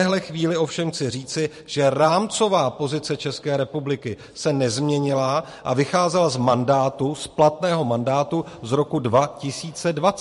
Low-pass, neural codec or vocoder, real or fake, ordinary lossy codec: 14.4 kHz; vocoder, 44.1 kHz, 128 mel bands every 512 samples, BigVGAN v2; fake; MP3, 48 kbps